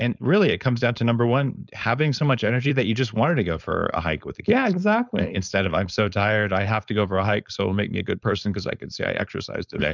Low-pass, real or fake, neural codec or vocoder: 7.2 kHz; fake; codec, 16 kHz, 4.8 kbps, FACodec